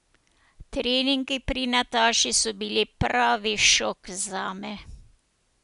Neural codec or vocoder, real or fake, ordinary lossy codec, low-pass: none; real; none; 10.8 kHz